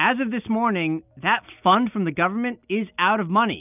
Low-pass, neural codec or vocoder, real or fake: 3.6 kHz; none; real